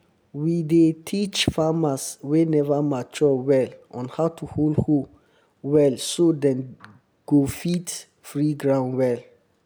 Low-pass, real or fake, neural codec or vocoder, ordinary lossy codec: none; real; none; none